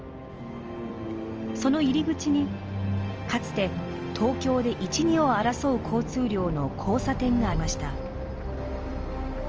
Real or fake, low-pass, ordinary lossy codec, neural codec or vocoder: real; 7.2 kHz; Opus, 24 kbps; none